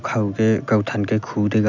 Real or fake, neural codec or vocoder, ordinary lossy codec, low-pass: real; none; none; 7.2 kHz